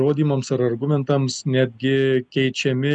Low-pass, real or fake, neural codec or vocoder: 9.9 kHz; real; none